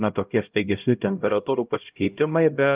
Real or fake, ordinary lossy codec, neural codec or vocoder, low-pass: fake; Opus, 24 kbps; codec, 16 kHz, 0.5 kbps, X-Codec, HuBERT features, trained on LibriSpeech; 3.6 kHz